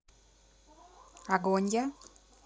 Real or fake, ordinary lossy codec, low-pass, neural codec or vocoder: real; none; none; none